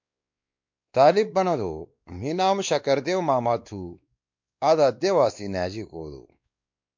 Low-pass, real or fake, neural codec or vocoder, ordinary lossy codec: 7.2 kHz; fake; codec, 16 kHz, 2 kbps, X-Codec, WavLM features, trained on Multilingual LibriSpeech; MP3, 64 kbps